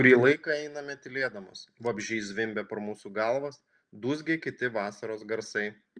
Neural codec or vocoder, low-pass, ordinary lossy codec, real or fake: none; 9.9 kHz; Opus, 32 kbps; real